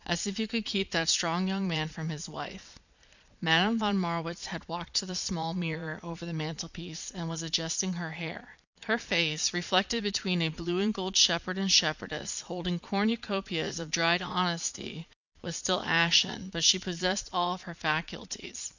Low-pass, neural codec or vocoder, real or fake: 7.2 kHz; vocoder, 22.05 kHz, 80 mel bands, Vocos; fake